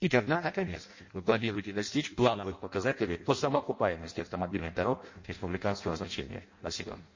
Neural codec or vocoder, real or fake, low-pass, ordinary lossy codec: codec, 16 kHz in and 24 kHz out, 0.6 kbps, FireRedTTS-2 codec; fake; 7.2 kHz; MP3, 32 kbps